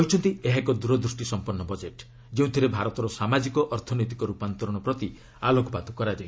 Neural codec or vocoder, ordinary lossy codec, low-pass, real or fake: none; none; none; real